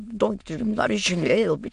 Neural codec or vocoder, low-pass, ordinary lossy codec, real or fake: autoencoder, 22.05 kHz, a latent of 192 numbers a frame, VITS, trained on many speakers; 9.9 kHz; MP3, 64 kbps; fake